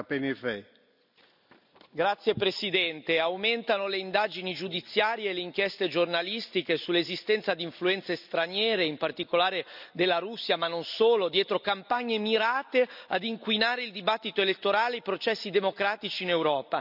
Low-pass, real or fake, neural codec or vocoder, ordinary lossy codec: 5.4 kHz; real; none; none